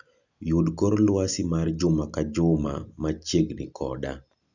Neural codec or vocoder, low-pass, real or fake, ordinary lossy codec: none; 7.2 kHz; real; none